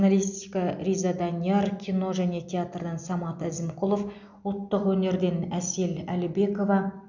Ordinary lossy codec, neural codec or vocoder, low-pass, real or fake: none; none; 7.2 kHz; real